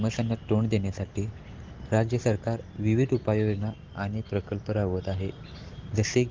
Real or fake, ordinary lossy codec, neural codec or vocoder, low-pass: real; Opus, 16 kbps; none; 7.2 kHz